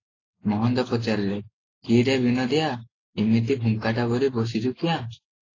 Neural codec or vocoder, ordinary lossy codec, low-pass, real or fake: none; AAC, 32 kbps; 7.2 kHz; real